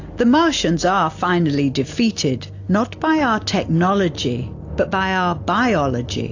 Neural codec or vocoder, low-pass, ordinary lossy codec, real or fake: none; 7.2 kHz; AAC, 48 kbps; real